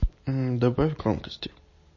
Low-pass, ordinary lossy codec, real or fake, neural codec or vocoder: 7.2 kHz; MP3, 32 kbps; real; none